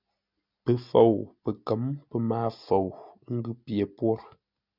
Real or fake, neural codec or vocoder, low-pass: real; none; 5.4 kHz